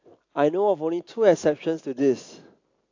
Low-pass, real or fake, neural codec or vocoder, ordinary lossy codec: 7.2 kHz; real; none; AAC, 48 kbps